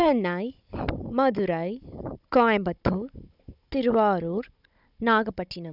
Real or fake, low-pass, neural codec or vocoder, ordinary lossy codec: fake; 5.4 kHz; codec, 16 kHz, 16 kbps, FunCodec, trained on Chinese and English, 50 frames a second; none